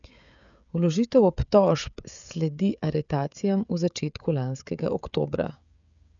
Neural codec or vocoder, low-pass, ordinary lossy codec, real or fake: codec, 16 kHz, 16 kbps, FreqCodec, smaller model; 7.2 kHz; none; fake